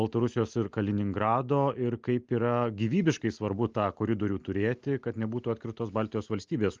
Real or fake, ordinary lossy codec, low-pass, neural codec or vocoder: real; Opus, 32 kbps; 7.2 kHz; none